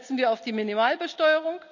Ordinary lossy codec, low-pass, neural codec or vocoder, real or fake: none; 7.2 kHz; none; real